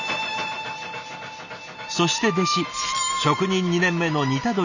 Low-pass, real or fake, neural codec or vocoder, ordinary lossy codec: 7.2 kHz; real; none; none